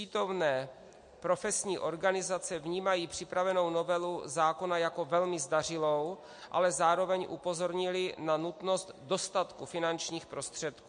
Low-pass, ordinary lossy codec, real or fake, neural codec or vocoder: 9.9 kHz; MP3, 48 kbps; real; none